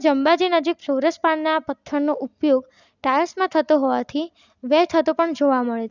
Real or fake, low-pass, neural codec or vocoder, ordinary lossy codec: real; 7.2 kHz; none; none